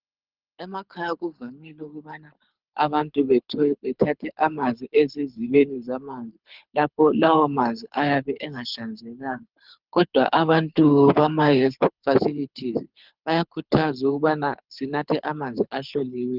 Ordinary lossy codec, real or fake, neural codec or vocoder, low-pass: Opus, 24 kbps; fake; codec, 24 kHz, 6 kbps, HILCodec; 5.4 kHz